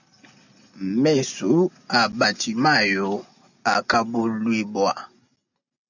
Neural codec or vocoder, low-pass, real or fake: none; 7.2 kHz; real